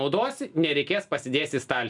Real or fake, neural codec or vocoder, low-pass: real; none; 10.8 kHz